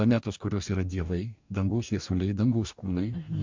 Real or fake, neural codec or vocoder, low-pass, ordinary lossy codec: fake; codec, 44.1 kHz, 2.6 kbps, DAC; 7.2 kHz; MP3, 64 kbps